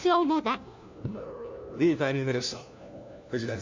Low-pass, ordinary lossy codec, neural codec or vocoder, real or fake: 7.2 kHz; MP3, 64 kbps; codec, 16 kHz, 1 kbps, FunCodec, trained on LibriTTS, 50 frames a second; fake